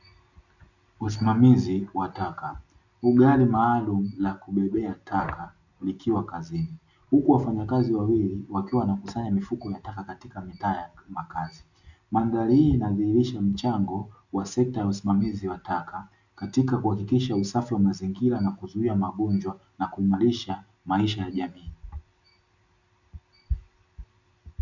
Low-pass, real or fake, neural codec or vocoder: 7.2 kHz; real; none